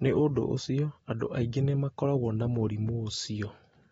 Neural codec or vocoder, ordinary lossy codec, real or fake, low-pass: none; AAC, 24 kbps; real; 19.8 kHz